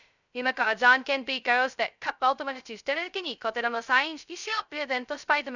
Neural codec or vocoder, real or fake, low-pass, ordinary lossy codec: codec, 16 kHz, 0.2 kbps, FocalCodec; fake; 7.2 kHz; none